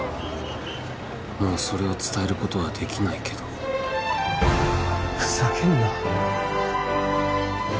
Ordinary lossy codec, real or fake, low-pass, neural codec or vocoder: none; real; none; none